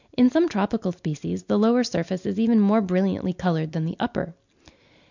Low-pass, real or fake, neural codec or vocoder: 7.2 kHz; real; none